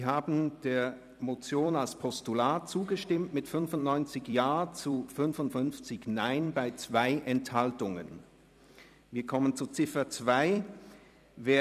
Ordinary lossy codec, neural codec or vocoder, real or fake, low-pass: none; vocoder, 44.1 kHz, 128 mel bands every 256 samples, BigVGAN v2; fake; 14.4 kHz